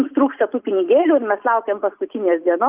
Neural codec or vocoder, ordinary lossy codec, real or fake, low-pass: none; Opus, 24 kbps; real; 3.6 kHz